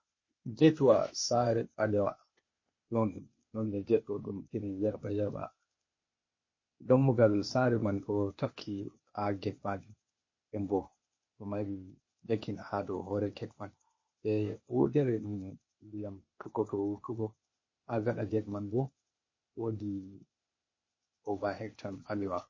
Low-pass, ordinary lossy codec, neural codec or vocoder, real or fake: 7.2 kHz; MP3, 32 kbps; codec, 16 kHz, 0.8 kbps, ZipCodec; fake